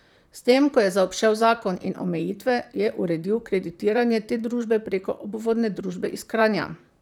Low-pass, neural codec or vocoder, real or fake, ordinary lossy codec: 19.8 kHz; vocoder, 44.1 kHz, 128 mel bands, Pupu-Vocoder; fake; none